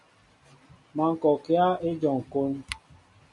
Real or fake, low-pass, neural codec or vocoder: real; 10.8 kHz; none